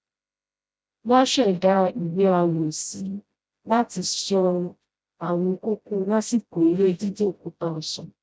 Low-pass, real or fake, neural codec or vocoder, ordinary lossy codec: none; fake; codec, 16 kHz, 0.5 kbps, FreqCodec, smaller model; none